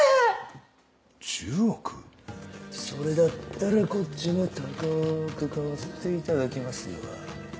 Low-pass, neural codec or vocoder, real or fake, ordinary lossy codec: none; none; real; none